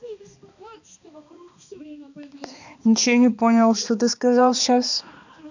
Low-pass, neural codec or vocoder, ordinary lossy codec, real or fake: 7.2 kHz; codec, 16 kHz, 2 kbps, X-Codec, HuBERT features, trained on balanced general audio; none; fake